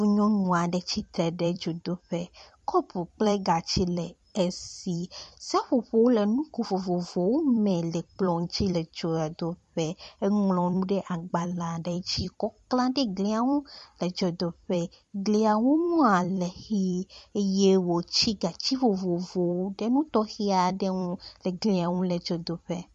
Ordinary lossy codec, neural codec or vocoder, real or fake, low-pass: MP3, 48 kbps; vocoder, 44.1 kHz, 128 mel bands every 256 samples, BigVGAN v2; fake; 14.4 kHz